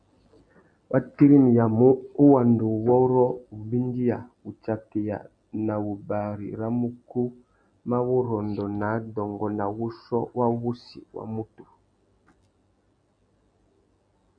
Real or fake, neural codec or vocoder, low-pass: real; none; 9.9 kHz